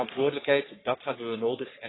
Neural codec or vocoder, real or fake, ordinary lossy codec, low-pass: codec, 44.1 kHz, 3.4 kbps, Pupu-Codec; fake; AAC, 16 kbps; 7.2 kHz